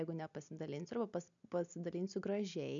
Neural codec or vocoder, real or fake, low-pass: none; real; 7.2 kHz